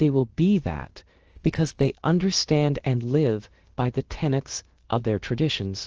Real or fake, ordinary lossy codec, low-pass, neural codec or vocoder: fake; Opus, 16 kbps; 7.2 kHz; codec, 16 kHz, about 1 kbps, DyCAST, with the encoder's durations